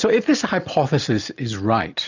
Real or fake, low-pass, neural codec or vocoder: real; 7.2 kHz; none